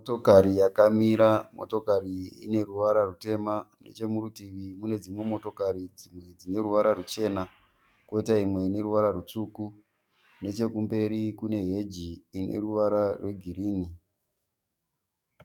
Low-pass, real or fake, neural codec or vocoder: 19.8 kHz; fake; codec, 44.1 kHz, 7.8 kbps, DAC